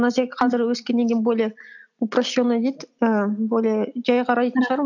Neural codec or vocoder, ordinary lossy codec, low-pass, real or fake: none; none; 7.2 kHz; real